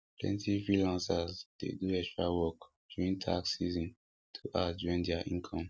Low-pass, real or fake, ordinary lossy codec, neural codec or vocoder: none; real; none; none